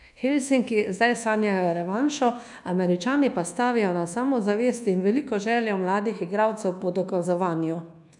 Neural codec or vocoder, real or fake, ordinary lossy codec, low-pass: codec, 24 kHz, 1.2 kbps, DualCodec; fake; none; 10.8 kHz